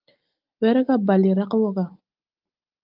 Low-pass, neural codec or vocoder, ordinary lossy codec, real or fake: 5.4 kHz; none; Opus, 24 kbps; real